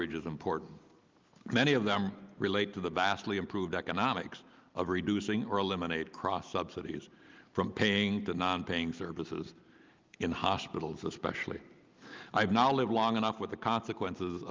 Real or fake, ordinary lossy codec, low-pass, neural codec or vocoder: real; Opus, 32 kbps; 7.2 kHz; none